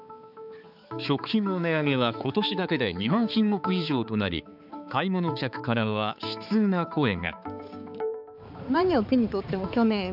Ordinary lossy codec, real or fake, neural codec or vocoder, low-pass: none; fake; codec, 16 kHz, 4 kbps, X-Codec, HuBERT features, trained on balanced general audio; 5.4 kHz